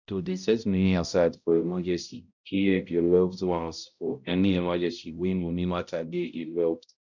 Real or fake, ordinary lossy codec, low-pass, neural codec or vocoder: fake; none; 7.2 kHz; codec, 16 kHz, 0.5 kbps, X-Codec, HuBERT features, trained on balanced general audio